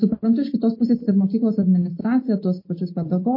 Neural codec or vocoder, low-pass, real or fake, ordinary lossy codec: none; 5.4 kHz; real; MP3, 24 kbps